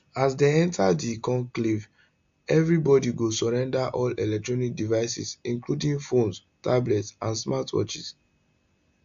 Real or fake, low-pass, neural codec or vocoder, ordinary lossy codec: real; 7.2 kHz; none; AAC, 64 kbps